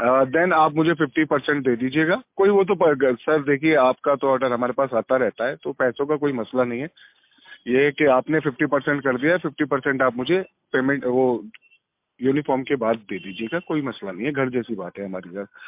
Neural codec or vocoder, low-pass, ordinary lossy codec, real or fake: none; 3.6 kHz; MP3, 32 kbps; real